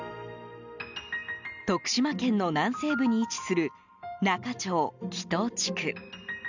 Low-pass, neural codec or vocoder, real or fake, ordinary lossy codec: 7.2 kHz; none; real; none